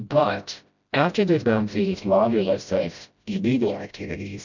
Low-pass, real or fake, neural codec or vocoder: 7.2 kHz; fake; codec, 16 kHz, 0.5 kbps, FreqCodec, smaller model